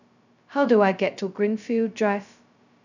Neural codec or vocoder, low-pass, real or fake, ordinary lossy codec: codec, 16 kHz, 0.2 kbps, FocalCodec; 7.2 kHz; fake; none